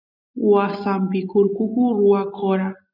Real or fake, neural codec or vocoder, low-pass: real; none; 5.4 kHz